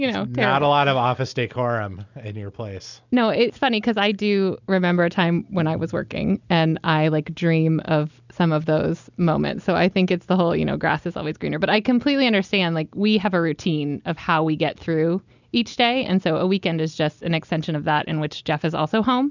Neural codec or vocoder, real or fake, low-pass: none; real; 7.2 kHz